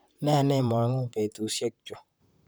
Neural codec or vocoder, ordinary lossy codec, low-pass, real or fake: vocoder, 44.1 kHz, 128 mel bands, Pupu-Vocoder; none; none; fake